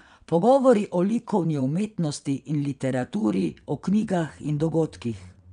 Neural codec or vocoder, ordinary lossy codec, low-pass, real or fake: vocoder, 22.05 kHz, 80 mel bands, WaveNeXt; none; 9.9 kHz; fake